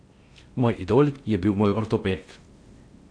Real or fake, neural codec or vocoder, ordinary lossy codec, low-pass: fake; codec, 16 kHz in and 24 kHz out, 0.6 kbps, FocalCodec, streaming, 2048 codes; AAC, 48 kbps; 9.9 kHz